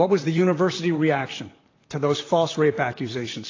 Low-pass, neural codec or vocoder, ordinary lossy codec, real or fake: 7.2 kHz; vocoder, 44.1 kHz, 128 mel bands, Pupu-Vocoder; AAC, 32 kbps; fake